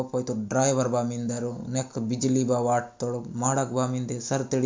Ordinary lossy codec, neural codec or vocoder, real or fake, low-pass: MP3, 64 kbps; none; real; 7.2 kHz